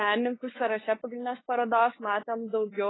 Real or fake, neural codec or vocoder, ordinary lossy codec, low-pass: fake; codec, 16 kHz, 8 kbps, FunCodec, trained on LibriTTS, 25 frames a second; AAC, 16 kbps; 7.2 kHz